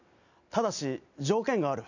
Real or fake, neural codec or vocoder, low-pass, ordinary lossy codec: real; none; 7.2 kHz; none